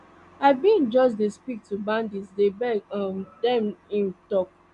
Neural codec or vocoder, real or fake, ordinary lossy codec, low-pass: none; real; none; 10.8 kHz